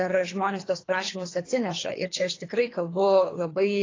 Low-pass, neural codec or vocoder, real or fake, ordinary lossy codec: 7.2 kHz; codec, 24 kHz, 3 kbps, HILCodec; fake; AAC, 32 kbps